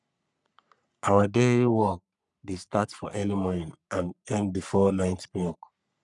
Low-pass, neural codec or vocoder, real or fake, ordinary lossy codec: 10.8 kHz; codec, 44.1 kHz, 3.4 kbps, Pupu-Codec; fake; none